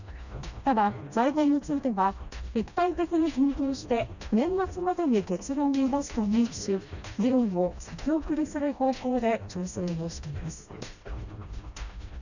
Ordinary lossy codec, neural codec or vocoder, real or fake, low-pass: none; codec, 16 kHz, 1 kbps, FreqCodec, smaller model; fake; 7.2 kHz